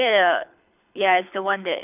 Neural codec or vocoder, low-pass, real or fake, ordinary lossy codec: codec, 24 kHz, 6 kbps, HILCodec; 3.6 kHz; fake; none